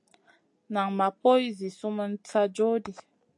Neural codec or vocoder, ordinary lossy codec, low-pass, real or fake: none; AAC, 64 kbps; 10.8 kHz; real